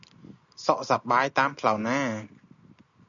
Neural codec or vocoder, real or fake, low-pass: none; real; 7.2 kHz